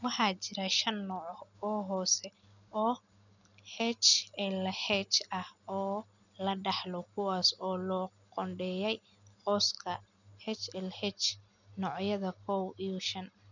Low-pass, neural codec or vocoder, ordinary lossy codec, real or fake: 7.2 kHz; none; none; real